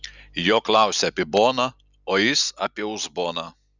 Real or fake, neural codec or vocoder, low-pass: real; none; 7.2 kHz